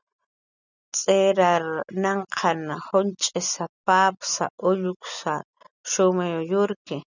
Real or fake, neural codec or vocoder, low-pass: real; none; 7.2 kHz